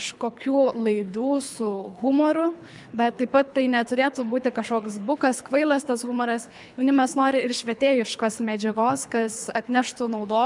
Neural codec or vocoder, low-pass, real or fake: codec, 24 kHz, 3 kbps, HILCodec; 10.8 kHz; fake